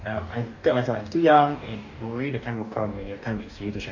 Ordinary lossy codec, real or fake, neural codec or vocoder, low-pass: none; fake; codec, 44.1 kHz, 2.6 kbps, DAC; 7.2 kHz